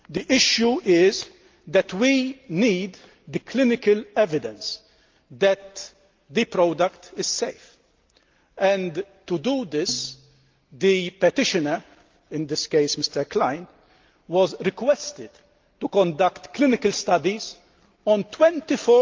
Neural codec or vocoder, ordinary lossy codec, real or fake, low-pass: none; Opus, 24 kbps; real; 7.2 kHz